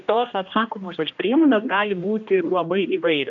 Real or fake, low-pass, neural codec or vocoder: fake; 7.2 kHz; codec, 16 kHz, 1 kbps, X-Codec, HuBERT features, trained on general audio